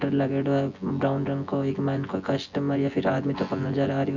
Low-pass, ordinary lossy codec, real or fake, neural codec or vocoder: 7.2 kHz; Opus, 64 kbps; fake; vocoder, 24 kHz, 100 mel bands, Vocos